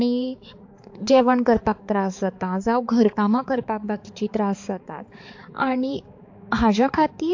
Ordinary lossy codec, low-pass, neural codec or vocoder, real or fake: AAC, 48 kbps; 7.2 kHz; codec, 16 kHz, 4 kbps, X-Codec, HuBERT features, trained on balanced general audio; fake